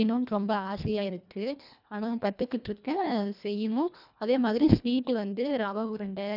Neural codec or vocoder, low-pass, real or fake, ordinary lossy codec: codec, 24 kHz, 1.5 kbps, HILCodec; 5.4 kHz; fake; none